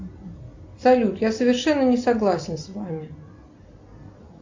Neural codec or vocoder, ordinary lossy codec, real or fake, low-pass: none; MP3, 48 kbps; real; 7.2 kHz